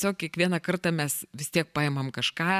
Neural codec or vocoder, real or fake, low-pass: vocoder, 44.1 kHz, 128 mel bands every 512 samples, BigVGAN v2; fake; 14.4 kHz